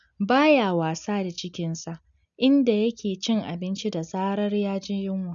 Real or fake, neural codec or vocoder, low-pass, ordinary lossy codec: real; none; 7.2 kHz; none